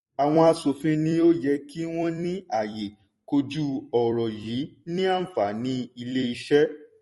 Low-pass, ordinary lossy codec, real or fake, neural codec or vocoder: 19.8 kHz; MP3, 48 kbps; fake; vocoder, 44.1 kHz, 128 mel bands every 256 samples, BigVGAN v2